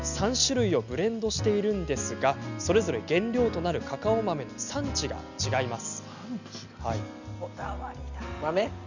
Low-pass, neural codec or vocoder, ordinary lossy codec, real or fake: 7.2 kHz; none; none; real